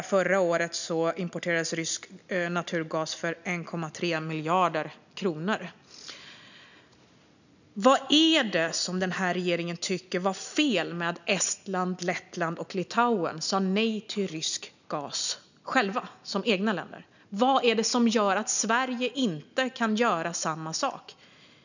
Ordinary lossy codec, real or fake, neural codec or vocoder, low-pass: none; real; none; 7.2 kHz